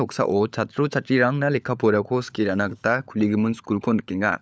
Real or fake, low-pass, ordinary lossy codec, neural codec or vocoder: fake; none; none; codec, 16 kHz, 16 kbps, FunCodec, trained on LibriTTS, 50 frames a second